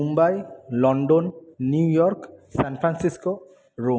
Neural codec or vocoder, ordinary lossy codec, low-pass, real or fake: none; none; none; real